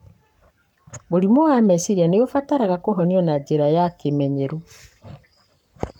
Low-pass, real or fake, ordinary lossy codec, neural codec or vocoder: 19.8 kHz; fake; none; codec, 44.1 kHz, 7.8 kbps, Pupu-Codec